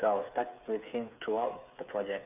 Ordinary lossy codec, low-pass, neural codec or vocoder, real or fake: none; 3.6 kHz; codec, 16 kHz, 8 kbps, FreqCodec, smaller model; fake